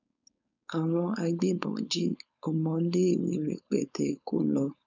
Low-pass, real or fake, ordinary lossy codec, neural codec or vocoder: 7.2 kHz; fake; none; codec, 16 kHz, 4.8 kbps, FACodec